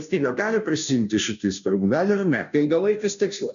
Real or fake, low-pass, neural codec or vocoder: fake; 7.2 kHz; codec, 16 kHz, 0.5 kbps, FunCodec, trained on Chinese and English, 25 frames a second